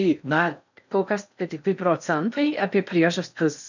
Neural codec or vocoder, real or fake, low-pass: codec, 16 kHz in and 24 kHz out, 0.6 kbps, FocalCodec, streaming, 4096 codes; fake; 7.2 kHz